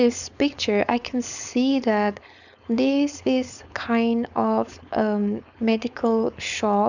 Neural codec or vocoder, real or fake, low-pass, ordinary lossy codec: codec, 16 kHz, 4.8 kbps, FACodec; fake; 7.2 kHz; none